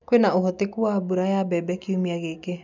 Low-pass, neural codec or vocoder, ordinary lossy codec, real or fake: 7.2 kHz; none; none; real